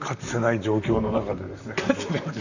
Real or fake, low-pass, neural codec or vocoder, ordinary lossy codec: fake; 7.2 kHz; vocoder, 44.1 kHz, 128 mel bands, Pupu-Vocoder; none